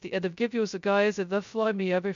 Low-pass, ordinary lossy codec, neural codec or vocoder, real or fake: 7.2 kHz; MP3, 64 kbps; codec, 16 kHz, 0.2 kbps, FocalCodec; fake